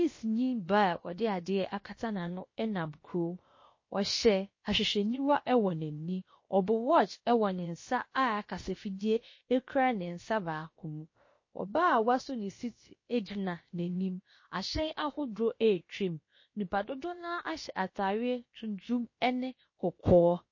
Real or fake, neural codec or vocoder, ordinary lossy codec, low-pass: fake; codec, 16 kHz, about 1 kbps, DyCAST, with the encoder's durations; MP3, 32 kbps; 7.2 kHz